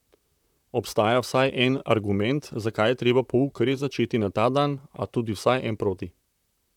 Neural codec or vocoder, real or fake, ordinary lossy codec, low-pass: vocoder, 44.1 kHz, 128 mel bands, Pupu-Vocoder; fake; none; 19.8 kHz